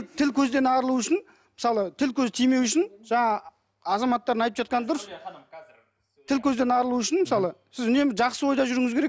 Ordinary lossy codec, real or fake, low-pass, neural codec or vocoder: none; real; none; none